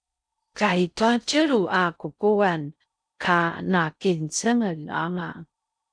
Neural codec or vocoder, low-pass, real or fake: codec, 16 kHz in and 24 kHz out, 0.6 kbps, FocalCodec, streaming, 4096 codes; 9.9 kHz; fake